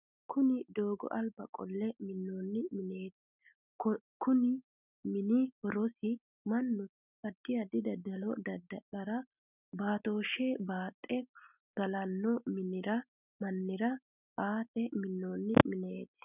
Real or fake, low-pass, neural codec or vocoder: real; 3.6 kHz; none